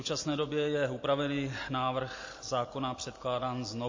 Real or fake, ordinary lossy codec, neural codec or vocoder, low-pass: real; MP3, 32 kbps; none; 7.2 kHz